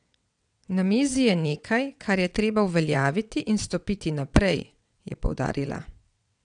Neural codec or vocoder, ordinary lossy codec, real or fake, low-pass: vocoder, 22.05 kHz, 80 mel bands, WaveNeXt; none; fake; 9.9 kHz